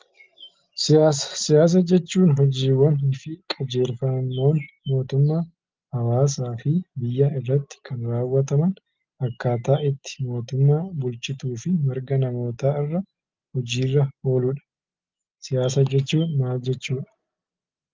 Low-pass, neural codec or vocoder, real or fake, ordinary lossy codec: 7.2 kHz; none; real; Opus, 32 kbps